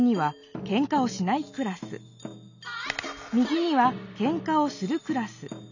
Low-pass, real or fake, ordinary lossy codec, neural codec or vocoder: 7.2 kHz; real; none; none